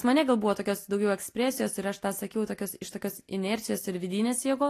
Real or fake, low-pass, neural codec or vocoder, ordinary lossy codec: real; 14.4 kHz; none; AAC, 48 kbps